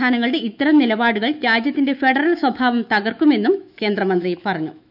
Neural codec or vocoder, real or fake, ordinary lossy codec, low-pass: autoencoder, 48 kHz, 128 numbers a frame, DAC-VAE, trained on Japanese speech; fake; none; 5.4 kHz